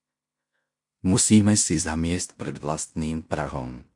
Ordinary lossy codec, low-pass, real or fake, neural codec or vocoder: MP3, 64 kbps; 10.8 kHz; fake; codec, 16 kHz in and 24 kHz out, 0.9 kbps, LongCat-Audio-Codec, fine tuned four codebook decoder